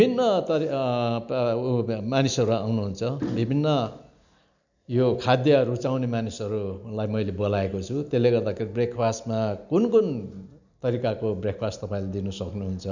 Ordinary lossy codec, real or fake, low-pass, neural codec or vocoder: none; real; 7.2 kHz; none